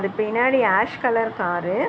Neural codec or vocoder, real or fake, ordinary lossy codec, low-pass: none; real; none; none